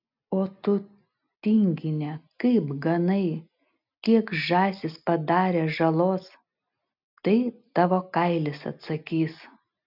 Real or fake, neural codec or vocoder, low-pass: real; none; 5.4 kHz